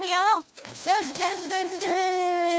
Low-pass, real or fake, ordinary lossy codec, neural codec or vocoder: none; fake; none; codec, 16 kHz, 1 kbps, FunCodec, trained on LibriTTS, 50 frames a second